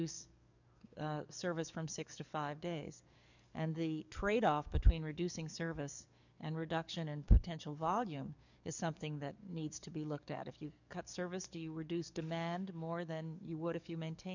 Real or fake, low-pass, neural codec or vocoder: fake; 7.2 kHz; codec, 44.1 kHz, 7.8 kbps, DAC